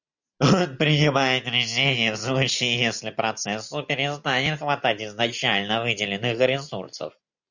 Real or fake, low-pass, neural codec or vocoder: real; 7.2 kHz; none